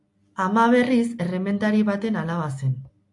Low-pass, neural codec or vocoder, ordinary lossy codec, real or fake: 10.8 kHz; none; AAC, 64 kbps; real